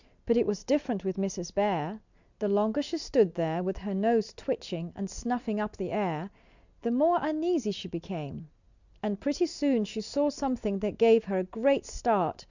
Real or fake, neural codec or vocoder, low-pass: real; none; 7.2 kHz